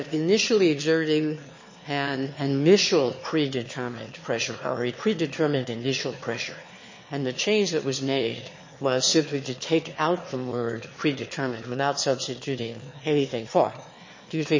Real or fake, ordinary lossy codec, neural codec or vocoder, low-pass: fake; MP3, 32 kbps; autoencoder, 22.05 kHz, a latent of 192 numbers a frame, VITS, trained on one speaker; 7.2 kHz